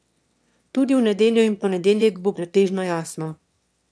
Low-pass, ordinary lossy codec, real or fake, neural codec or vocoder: none; none; fake; autoencoder, 22.05 kHz, a latent of 192 numbers a frame, VITS, trained on one speaker